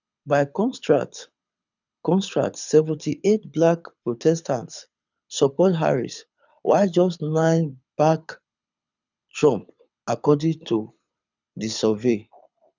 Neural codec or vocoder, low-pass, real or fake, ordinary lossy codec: codec, 24 kHz, 6 kbps, HILCodec; 7.2 kHz; fake; none